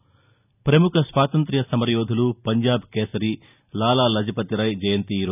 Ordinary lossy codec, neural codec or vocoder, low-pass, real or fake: none; none; 3.6 kHz; real